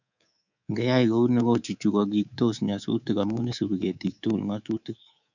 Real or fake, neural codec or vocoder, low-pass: fake; codec, 24 kHz, 3.1 kbps, DualCodec; 7.2 kHz